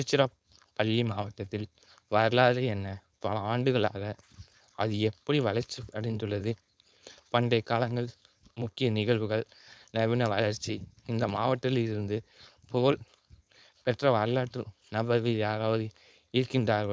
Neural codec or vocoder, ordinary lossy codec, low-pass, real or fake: codec, 16 kHz, 4.8 kbps, FACodec; none; none; fake